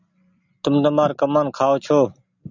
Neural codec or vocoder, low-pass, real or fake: none; 7.2 kHz; real